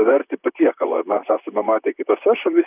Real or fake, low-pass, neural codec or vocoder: fake; 3.6 kHz; vocoder, 44.1 kHz, 128 mel bands, Pupu-Vocoder